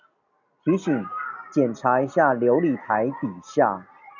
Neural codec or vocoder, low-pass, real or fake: none; 7.2 kHz; real